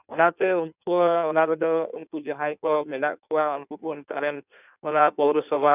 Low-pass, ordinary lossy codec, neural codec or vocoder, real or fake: 3.6 kHz; none; codec, 16 kHz in and 24 kHz out, 1.1 kbps, FireRedTTS-2 codec; fake